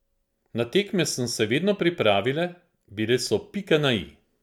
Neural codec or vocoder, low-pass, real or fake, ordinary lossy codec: none; 19.8 kHz; real; MP3, 96 kbps